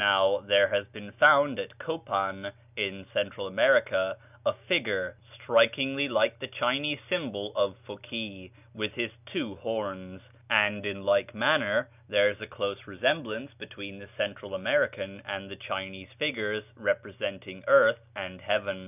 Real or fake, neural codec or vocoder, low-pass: real; none; 3.6 kHz